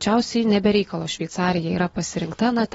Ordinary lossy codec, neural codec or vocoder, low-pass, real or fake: AAC, 24 kbps; none; 19.8 kHz; real